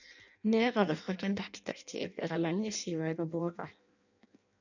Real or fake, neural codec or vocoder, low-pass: fake; codec, 16 kHz in and 24 kHz out, 0.6 kbps, FireRedTTS-2 codec; 7.2 kHz